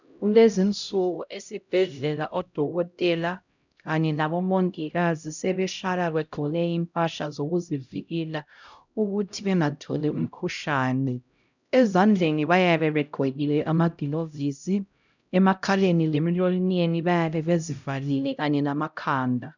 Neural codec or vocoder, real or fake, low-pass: codec, 16 kHz, 0.5 kbps, X-Codec, HuBERT features, trained on LibriSpeech; fake; 7.2 kHz